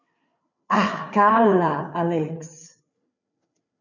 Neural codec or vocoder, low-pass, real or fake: codec, 16 kHz, 4 kbps, FreqCodec, larger model; 7.2 kHz; fake